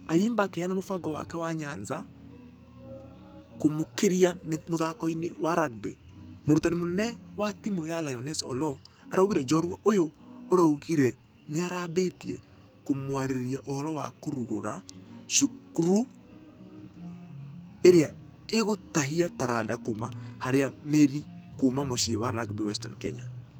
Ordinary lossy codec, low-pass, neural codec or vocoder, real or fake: none; none; codec, 44.1 kHz, 2.6 kbps, SNAC; fake